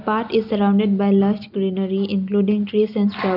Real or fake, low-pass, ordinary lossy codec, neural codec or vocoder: real; 5.4 kHz; none; none